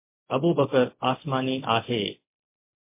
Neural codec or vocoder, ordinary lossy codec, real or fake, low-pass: none; MP3, 24 kbps; real; 3.6 kHz